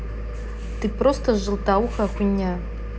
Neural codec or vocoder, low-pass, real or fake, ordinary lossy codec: none; none; real; none